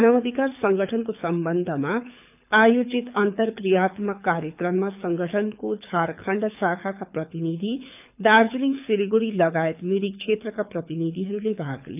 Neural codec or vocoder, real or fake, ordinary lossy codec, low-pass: codec, 24 kHz, 6 kbps, HILCodec; fake; none; 3.6 kHz